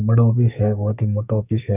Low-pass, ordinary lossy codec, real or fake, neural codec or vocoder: 3.6 kHz; none; fake; codec, 44.1 kHz, 2.6 kbps, SNAC